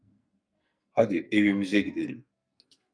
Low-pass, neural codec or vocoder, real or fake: 9.9 kHz; codec, 44.1 kHz, 2.6 kbps, SNAC; fake